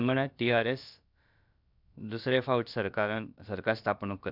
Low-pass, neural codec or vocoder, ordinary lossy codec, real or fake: 5.4 kHz; codec, 16 kHz, about 1 kbps, DyCAST, with the encoder's durations; none; fake